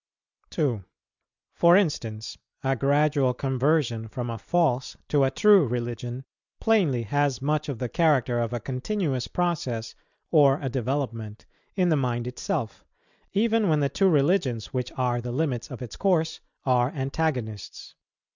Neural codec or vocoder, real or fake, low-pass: none; real; 7.2 kHz